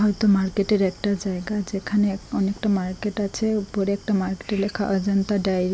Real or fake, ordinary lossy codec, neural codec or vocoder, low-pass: real; none; none; none